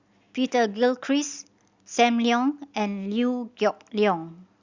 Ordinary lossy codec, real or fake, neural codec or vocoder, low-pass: Opus, 64 kbps; real; none; 7.2 kHz